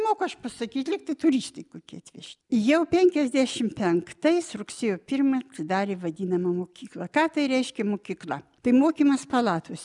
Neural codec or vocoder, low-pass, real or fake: none; 10.8 kHz; real